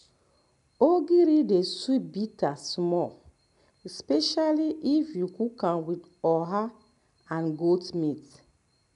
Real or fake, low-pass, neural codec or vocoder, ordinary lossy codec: real; 10.8 kHz; none; none